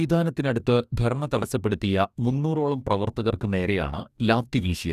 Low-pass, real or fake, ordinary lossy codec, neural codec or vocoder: 14.4 kHz; fake; none; codec, 44.1 kHz, 2.6 kbps, DAC